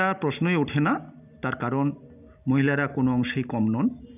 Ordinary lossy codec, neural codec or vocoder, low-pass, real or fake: none; none; 3.6 kHz; real